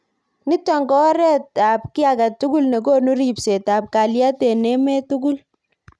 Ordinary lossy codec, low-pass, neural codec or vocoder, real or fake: none; none; none; real